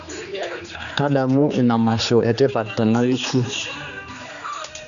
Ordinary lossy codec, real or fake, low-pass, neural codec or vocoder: none; fake; 7.2 kHz; codec, 16 kHz, 2 kbps, X-Codec, HuBERT features, trained on general audio